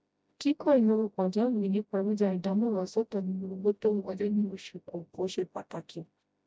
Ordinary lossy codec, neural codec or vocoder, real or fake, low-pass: none; codec, 16 kHz, 0.5 kbps, FreqCodec, smaller model; fake; none